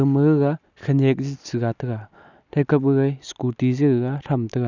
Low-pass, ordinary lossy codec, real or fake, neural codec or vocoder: 7.2 kHz; none; real; none